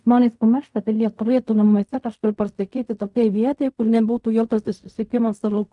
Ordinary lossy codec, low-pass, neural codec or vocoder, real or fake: MP3, 96 kbps; 10.8 kHz; codec, 16 kHz in and 24 kHz out, 0.4 kbps, LongCat-Audio-Codec, fine tuned four codebook decoder; fake